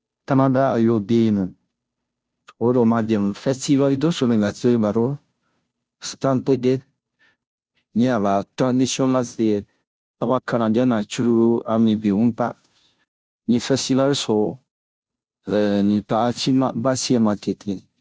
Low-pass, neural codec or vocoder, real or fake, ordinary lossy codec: none; codec, 16 kHz, 0.5 kbps, FunCodec, trained on Chinese and English, 25 frames a second; fake; none